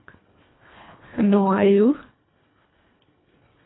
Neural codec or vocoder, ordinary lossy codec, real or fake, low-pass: codec, 24 kHz, 1.5 kbps, HILCodec; AAC, 16 kbps; fake; 7.2 kHz